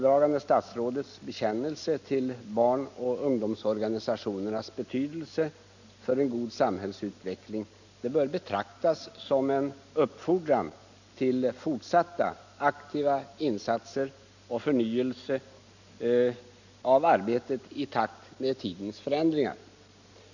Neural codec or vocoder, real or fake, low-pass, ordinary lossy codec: none; real; 7.2 kHz; none